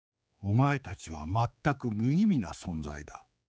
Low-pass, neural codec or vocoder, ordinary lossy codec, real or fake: none; codec, 16 kHz, 4 kbps, X-Codec, HuBERT features, trained on general audio; none; fake